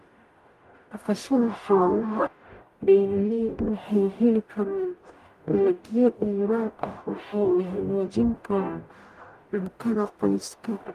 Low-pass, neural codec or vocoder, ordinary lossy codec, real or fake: 14.4 kHz; codec, 44.1 kHz, 0.9 kbps, DAC; Opus, 32 kbps; fake